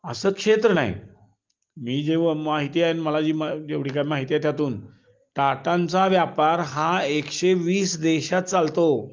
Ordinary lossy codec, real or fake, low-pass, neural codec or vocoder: Opus, 32 kbps; real; 7.2 kHz; none